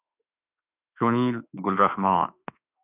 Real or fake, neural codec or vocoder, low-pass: fake; autoencoder, 48 kHz, 32 numbers a frame, DAC-VAE, trained on Japanese speech; 3.6 kHz